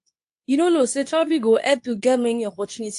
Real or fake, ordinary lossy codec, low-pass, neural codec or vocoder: fake; AAC, 64 kbps; 10.8 kHz; codec, 24 kHz, 0.9 kbps, WavTokenizer, medium speech release version 2